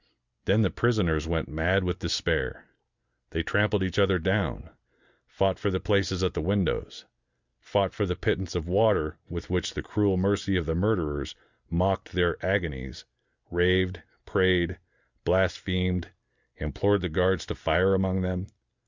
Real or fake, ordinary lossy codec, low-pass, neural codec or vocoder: real; Opus, 64 kbps; 7.2 kHz; none